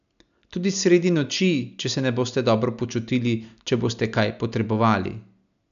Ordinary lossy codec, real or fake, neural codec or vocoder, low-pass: none; real; none; 7.2 kHz